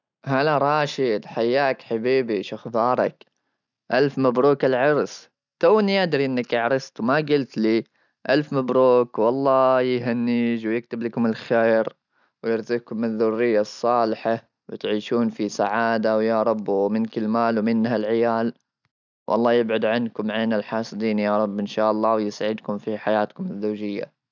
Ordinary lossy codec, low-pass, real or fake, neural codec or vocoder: none; 7.2 kHz; real; none